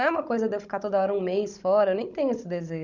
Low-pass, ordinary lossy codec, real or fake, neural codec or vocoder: 7.2 kHz; none; fake; codec, 16 kHz, 16 kbps, FunCodec, trained on Chinese and English, 50 frames a second